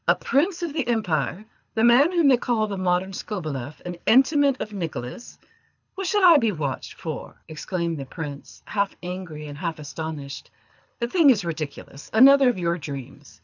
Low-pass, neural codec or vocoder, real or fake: 7.2 kHz; codec, 24 kHz, 6 kbps, HILCodec; fake